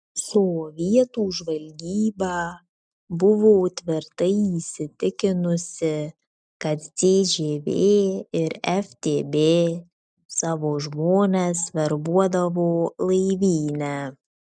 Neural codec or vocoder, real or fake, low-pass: none; real; 9.9 kHz